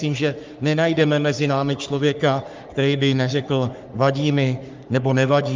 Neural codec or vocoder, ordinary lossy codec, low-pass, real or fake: codec, 44.1 kHz, 3.4 kbps, Pupu-Codec; Opus, 32 kbps; 7.2 kHz; fake